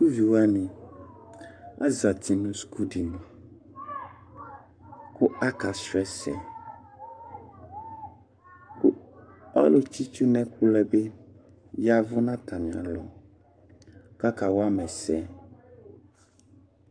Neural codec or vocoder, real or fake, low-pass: codec, 44.1 kHz, 7.8 kbps, Pupu-Codec; fake; 9.9 kHz